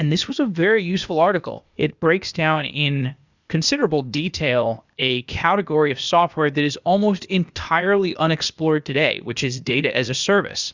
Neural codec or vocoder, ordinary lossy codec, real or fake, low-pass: codec, 16 kHz, 0.8 kbps, ZipCodec; Opus, 64 kbps; fake; 7.2 kHz